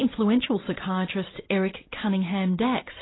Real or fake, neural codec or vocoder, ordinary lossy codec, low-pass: real; none; AAC, 16 kbps; 7.2 kHz